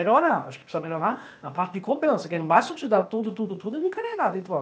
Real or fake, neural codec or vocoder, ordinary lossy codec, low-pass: fake; codec, 16 kHz, 0.8 kbps, ZipCodec; none; none